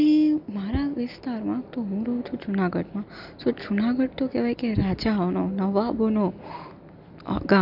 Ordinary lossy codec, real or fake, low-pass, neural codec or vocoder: none; real; 5.4 kHz; none